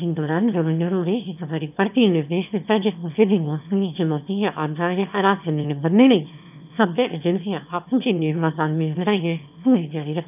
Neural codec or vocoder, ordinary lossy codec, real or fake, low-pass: autoencoder, 22.05 kHz, a latent of 192 numbers a frame, VITS, trained on one speaker; none; fake; 3.6 kHz